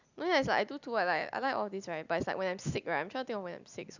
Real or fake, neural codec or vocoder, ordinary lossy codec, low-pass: real; none; none; 7.2 kHz